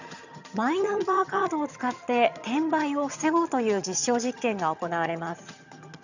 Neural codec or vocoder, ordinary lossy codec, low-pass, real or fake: vocoder, 22.05 kHz, 80 mel bands, HiFi-GAN; none; 7.2 kHz; fake